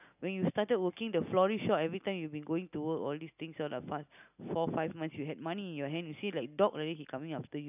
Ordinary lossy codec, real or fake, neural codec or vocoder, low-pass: none; real; none; 3.6 kHz